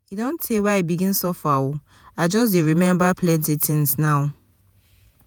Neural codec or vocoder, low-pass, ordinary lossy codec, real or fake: vocoder, 48 kHz, 128 mel bands, Vocos; none; none; fake